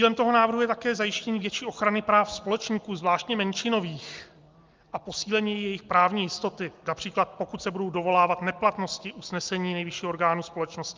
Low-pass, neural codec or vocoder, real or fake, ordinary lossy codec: 7.2 kHz; none; real; Opus, 24 kbps